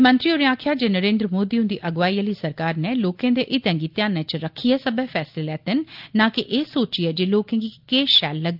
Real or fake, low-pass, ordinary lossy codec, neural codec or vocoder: real; 5.4 kHz; Opus, 24 kbps; none